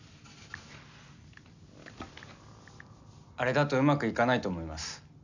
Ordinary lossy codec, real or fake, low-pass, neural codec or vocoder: none; real; 7.2 kHz; none